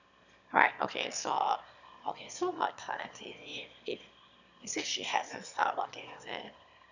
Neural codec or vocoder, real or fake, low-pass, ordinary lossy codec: autoencoder, 22.05 kHz, a latent of 192 numbers a frame, VITS, trained on one speaker; fake; 7.2 kHz; none